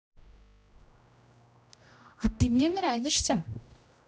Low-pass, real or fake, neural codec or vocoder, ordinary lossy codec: none; fake; codec, 16 kHz, 0.5 kbps, X-Codec, HuBERT features, trained on general audio; none